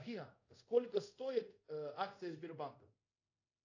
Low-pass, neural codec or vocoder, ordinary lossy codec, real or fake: 7.2 kHz; codec, 24 kHz, 0.5 kbps, DualCodec; AAC, 48 kbps; fake